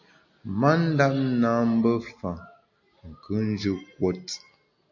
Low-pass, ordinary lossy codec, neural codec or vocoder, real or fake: 7.2 kHz; MP3, 48 kbps; none; real